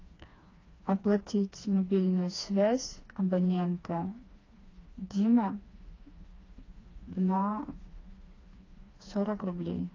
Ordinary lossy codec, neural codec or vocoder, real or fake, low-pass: AAC, 32 kbps; codec, 16 kHz, 2 kbps, FreqCodec, smaller model; fake; 7.2 kHz